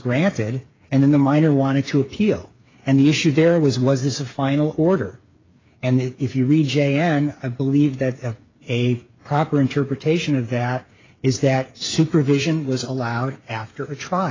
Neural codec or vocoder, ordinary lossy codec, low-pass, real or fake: codec, 16 kHz, 8 kbps, FreqCodec, smaller model; AAC, 32 kbps; 7.2 kHz; fake